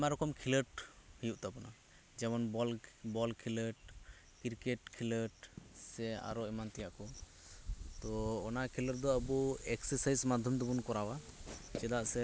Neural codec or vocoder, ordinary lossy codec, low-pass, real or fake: none; none; none; real